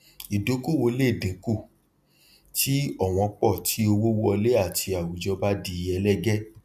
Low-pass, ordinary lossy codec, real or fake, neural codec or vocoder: 14.4 kHz; none; real; none